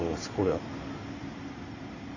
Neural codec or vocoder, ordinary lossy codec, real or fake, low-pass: none; Opus, 64 kbps; real; 7.2 kHz